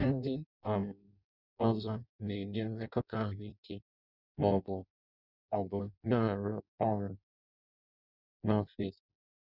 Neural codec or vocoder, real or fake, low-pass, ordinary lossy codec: codec, 16 kHz in and 24 kHz out, 0.6 kbps, FireRedTTS-2 codec; fake; 5.4 kHz; none